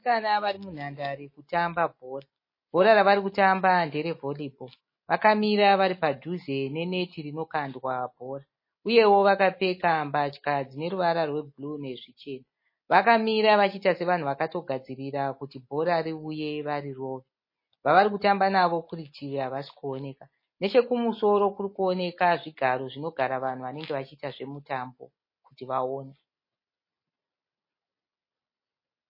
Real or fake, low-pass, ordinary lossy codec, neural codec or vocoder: real; 5.4 kHz; MP3, 24 kbps; none